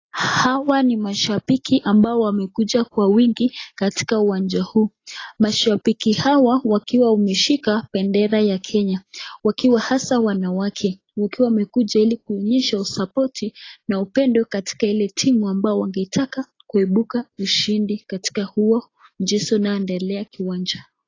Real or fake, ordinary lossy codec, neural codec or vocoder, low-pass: real; AAC, 32 kbps; none; 7.2 kHz